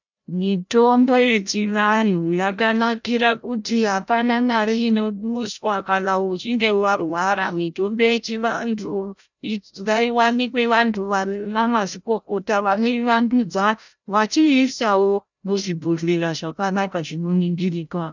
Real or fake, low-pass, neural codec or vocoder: fake; 7.2 kHz; codec, 16 kHz, 0.5 kbps, FreqCodec, larger model